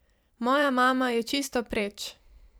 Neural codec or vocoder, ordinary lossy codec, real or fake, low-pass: vocoder, 44.1 kHz, 128 mel bands every 512 samples, BigVGAN v2; none; fake; none